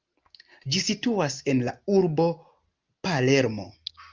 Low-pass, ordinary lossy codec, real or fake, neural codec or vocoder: 7.2 kHz; Opus, 24 kbps; real; none